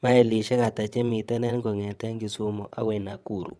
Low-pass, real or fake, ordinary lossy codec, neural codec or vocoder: none; fake; none; vocoder, 22.05 kHz, 80 mel bands, WaveNeXt